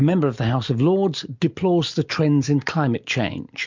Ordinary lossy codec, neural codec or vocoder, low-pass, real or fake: MP3, 64 kbps; none; 7.2 kHz; real